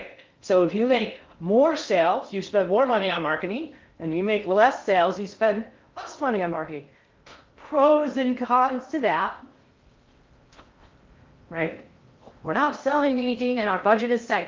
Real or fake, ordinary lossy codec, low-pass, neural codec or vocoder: fake; Opus, 24 kbps; 7.2 kHz; codec, 16 kHz in and 24 kHz out, 0.6 kbps, FocalCodec, streaming, 4096 codes